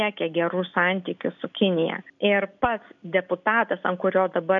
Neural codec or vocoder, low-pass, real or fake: none; 7.2 kHz; real